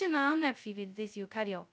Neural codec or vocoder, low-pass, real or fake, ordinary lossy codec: codec, 16 kHz, 0.2 kbps, FocalCodec; none; fake; none